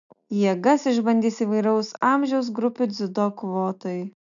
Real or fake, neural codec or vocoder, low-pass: real; none; 7.2 kHz